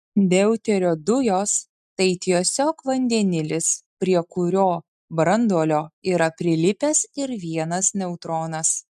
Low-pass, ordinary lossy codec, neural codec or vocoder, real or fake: 14.4 kHz; MP3, 96 kbps; none; real